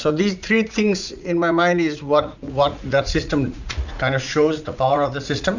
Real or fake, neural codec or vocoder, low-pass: fake; vocoder, 44.1 kHz, 80 mel bands, Vocos; 7.2 kHz